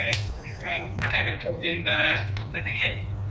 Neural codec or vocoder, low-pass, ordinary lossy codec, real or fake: codec, 16 kHz, 2 kbps, FreqCodec, smaller model; none; none; fake